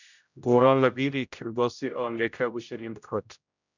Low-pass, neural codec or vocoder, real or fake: 7.2 kHz; codec, 16 kHz, 0.5 kbps, X-Codec, HuBERT features, trained on general audio; fake